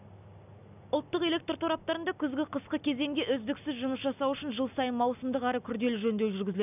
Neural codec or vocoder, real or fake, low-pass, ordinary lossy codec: none; real; 3.6 kHz; none